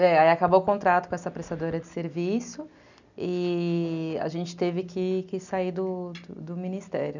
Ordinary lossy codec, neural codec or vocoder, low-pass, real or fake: none; none; 7.2 kHz; real